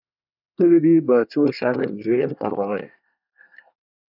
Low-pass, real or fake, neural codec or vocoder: 5.4 kHz; fake; codec, 24 kHz, 1 kbps, SNAC